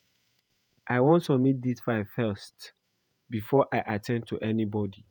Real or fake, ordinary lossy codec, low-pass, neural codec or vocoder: fake; none; none; vocoder, 48 kHz, 128 mel bands, Vocos